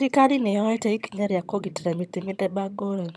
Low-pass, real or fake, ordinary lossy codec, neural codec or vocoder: none; fake; none; vocoder, 22.05 kHz, 80 mel bands, HiFi-GAN